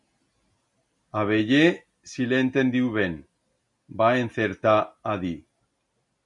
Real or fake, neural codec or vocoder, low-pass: real; none; 10.8 kHz